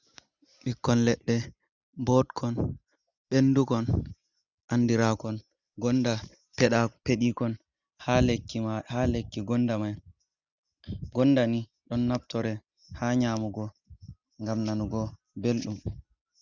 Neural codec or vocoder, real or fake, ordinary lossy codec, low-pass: none; real; Opus, 64 kbps; 7.2 kHz